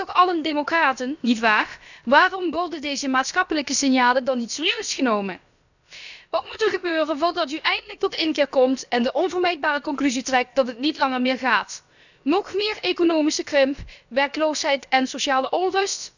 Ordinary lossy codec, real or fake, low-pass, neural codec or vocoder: none; fake; 7.2 kHz; codec, 16 kHz, about 1 kbps, DyCAST, with the encoder's durations